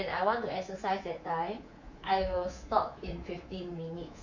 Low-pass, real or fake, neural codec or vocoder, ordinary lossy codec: 7.2 kHz; fake; codec, 24 kHz, 3.1 kbps, DualCodec; Opus, 64 kbps